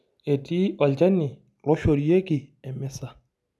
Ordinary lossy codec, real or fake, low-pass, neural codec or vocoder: none; real; none; none